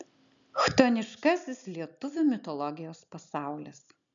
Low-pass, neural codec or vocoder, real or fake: 7.2 kHz; none; real